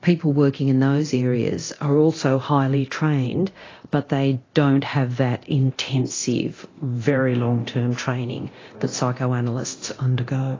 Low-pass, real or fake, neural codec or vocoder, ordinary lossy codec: 7.2 kHz; fake; codec, 24 kHz, 0.9 kbps, DualCodec; AAC, 32 kbps